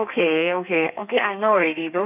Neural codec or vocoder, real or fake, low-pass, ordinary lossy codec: codec, 32 kHz, 1.9 kbps, SNAC; fake; 3.6 kHz; none